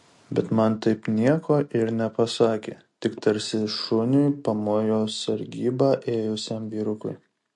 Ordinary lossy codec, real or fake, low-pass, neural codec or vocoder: MP3, 48 kbps; real; 10.8 kHz; none